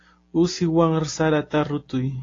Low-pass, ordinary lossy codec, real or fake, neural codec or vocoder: 7.2 kHz; AAC, 32 kbps; real; none